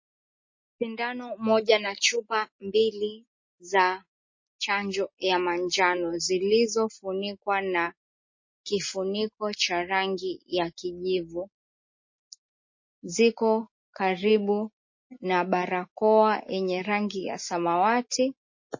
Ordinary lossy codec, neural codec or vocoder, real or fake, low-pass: MP3, 32 kbps; none; real; 7.2 kHz